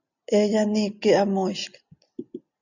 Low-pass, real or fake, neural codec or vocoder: 7.2 kHz; real; none